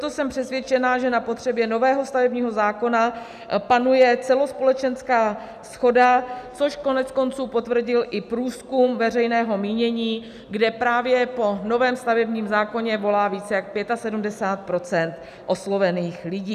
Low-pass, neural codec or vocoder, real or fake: 14.4 kHz; none; real